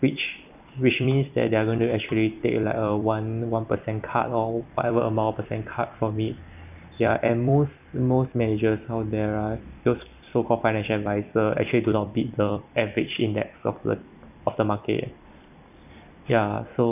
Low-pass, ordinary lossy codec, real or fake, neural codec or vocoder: 3.6 kHz; none; fake; vocoder, 44.1 kHz, 128 mel bands every 256 samples, BigVGAN v2